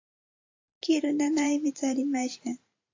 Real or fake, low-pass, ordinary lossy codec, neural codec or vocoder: fake; 7.2 kHz; AAC, 48 kbps; vocoder, 44.1 kHz, 128 mel bands every 512 samples, BigVGAN v2